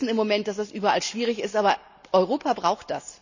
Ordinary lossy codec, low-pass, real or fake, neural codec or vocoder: none; 7.2 kHz; real; none